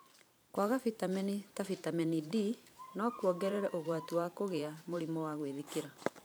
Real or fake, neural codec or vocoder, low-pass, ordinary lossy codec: fake; vocoder, 44.1 kHz, 128 mel bands every 512 samples, BigVGAN v2; none; none